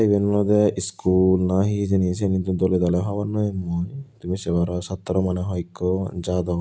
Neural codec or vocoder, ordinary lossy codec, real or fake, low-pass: none; none; real; none